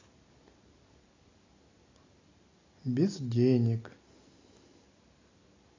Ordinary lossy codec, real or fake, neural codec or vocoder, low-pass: AAC, 32 kbps; real; none; 7.2 kHz